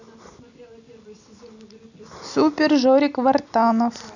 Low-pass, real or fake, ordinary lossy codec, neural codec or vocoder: 7.2 kHz; real; none; none